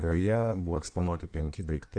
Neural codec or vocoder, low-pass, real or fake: codec, 16 kHz in and 24 kHz out, 1.1 kbps, FireRedTTS-2 codec; 9.9 kHz; fake